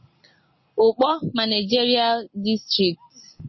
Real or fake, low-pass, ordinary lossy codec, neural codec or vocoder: real; 7.2 kHz; MP3, 24 kbps; none